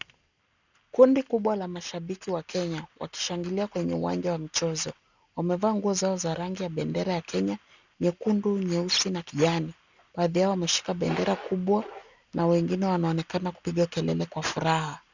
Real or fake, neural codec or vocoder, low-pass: real; none; 7.2 kHz